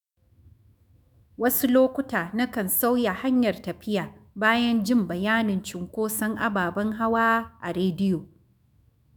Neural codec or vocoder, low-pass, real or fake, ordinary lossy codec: autoencoder, 48 kHz, 128 numbers a frame, DAC-VAE, trained on Japanese speech; none; fake; none